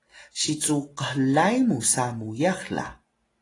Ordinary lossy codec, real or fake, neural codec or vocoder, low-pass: AAC, 32 kbps; real; none; 10.8 kHz